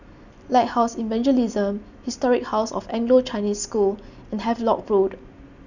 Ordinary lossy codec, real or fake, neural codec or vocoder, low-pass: none; real; none; 7.2 kHz